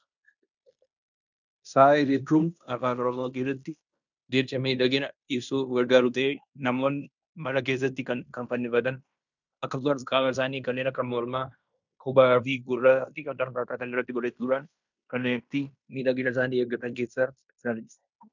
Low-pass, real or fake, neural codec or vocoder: 7.2 kHz; fake; codec, 16 kHz in and 24 kHz out, 0.9 kbps, LongCat-Audio-Codec, fine tuned four codebook decoder